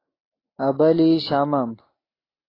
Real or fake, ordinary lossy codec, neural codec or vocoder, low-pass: real; AAC, 24 kbps; none; 5.4 kHz